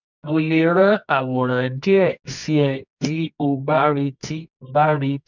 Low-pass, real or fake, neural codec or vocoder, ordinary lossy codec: 7.2 kHz; fake; codec, 24 kHz, 0.9 kbps, WavTokenizer, medium music audio release; none